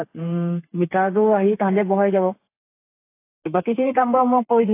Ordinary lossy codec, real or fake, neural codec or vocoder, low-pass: AAC, 24 kbps; fake; codec, 32 kHz, 1.9 kbps, SNAC; 3.6 kHz